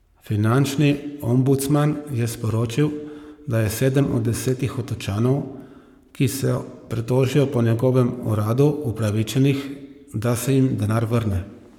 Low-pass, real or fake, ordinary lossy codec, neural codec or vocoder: 19.8 kHz; fake; none; codec, 44.1 kHz, 7.8 kbps, Pupu-Codec